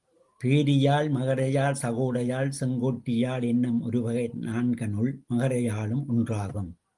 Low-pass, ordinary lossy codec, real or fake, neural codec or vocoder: 10.8 kHz; Opus, 24 kbps; real; none